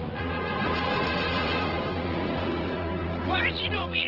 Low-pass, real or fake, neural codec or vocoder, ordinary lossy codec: 5.4 kHz; fake; vocoder, 44.1 kHz, 80 mel bands, Vocos; Opus, 16 kbps